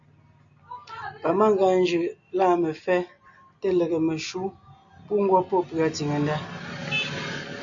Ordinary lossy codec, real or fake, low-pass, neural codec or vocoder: MP3, 64 kbps; real; 7.2 kHz; none